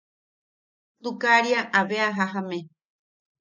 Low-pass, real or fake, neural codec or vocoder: 7.2 kHz; real; none